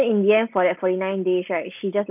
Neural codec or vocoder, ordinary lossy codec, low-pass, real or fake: none; none; 3.6 kHz; real